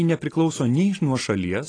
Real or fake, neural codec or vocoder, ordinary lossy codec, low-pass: real; none; AAC, 32 kbps; 9.9 kHz